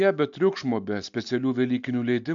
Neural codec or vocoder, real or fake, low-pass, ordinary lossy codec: none; real; 7.2 kHz; AAC, 64 kbps